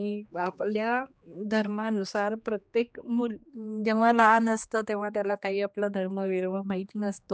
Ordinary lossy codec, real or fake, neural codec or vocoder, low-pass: none; fake; codec, 16 kHz, 2 kbps, X-Codec, HuBERT features, trained on general audio; none